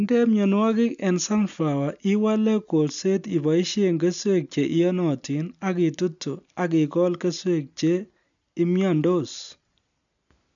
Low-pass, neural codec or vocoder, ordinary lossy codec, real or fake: 7.2 kHz; none; none; real